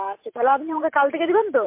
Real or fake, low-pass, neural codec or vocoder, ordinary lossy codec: fake; 3.6 kHz; vocoder, 44.1 kHz, 128 mel bands every 512 samples, BigVGAN v2; MP3, 24 kbps